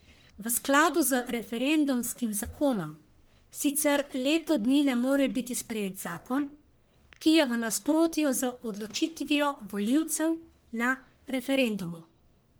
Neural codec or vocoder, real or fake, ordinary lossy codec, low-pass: codec, 44.1 kHz, 1.7 kbps, Pupu-Codec; fake; none; none